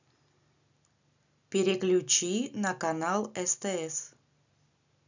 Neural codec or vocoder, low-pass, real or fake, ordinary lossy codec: none; 7.2 kHz; real; none